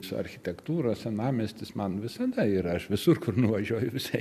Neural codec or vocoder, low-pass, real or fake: none; 14.4 kHz; real